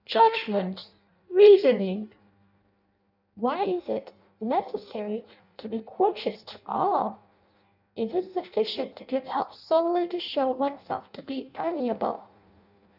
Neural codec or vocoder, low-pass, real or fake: codec, 16 kHz in and 24 kHz out, 0.6 kbps, FireRedTTS-2 codec; 5.4 kHz; fake